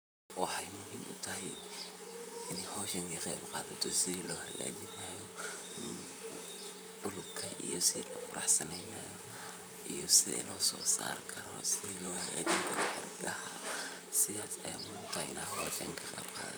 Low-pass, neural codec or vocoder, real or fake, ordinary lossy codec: none; vocoder, 44.1 kHz, 128 mel bands, Pupu-Vocoder; fake; none